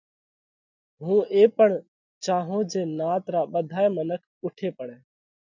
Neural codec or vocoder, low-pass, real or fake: none; 7.2 kHz; real